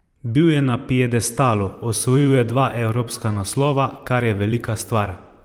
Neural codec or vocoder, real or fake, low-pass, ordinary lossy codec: vocoder, 44.1 kHz, 128 mel bands, Pupu-Vocoder; fake; 19.8 kHz; Opus, 32 kbps